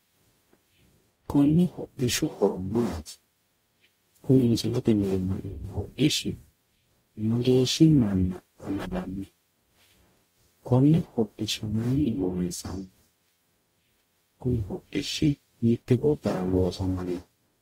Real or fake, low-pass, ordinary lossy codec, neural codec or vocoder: fake; 19.8 kHz; AAC, 48 kbps; codec, 44.1 kHz, 0.9 kbps, DAC